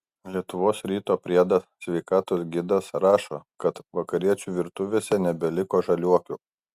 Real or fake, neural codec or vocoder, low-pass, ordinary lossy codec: real; none; 14.4 kHz; Opus, 64 kbps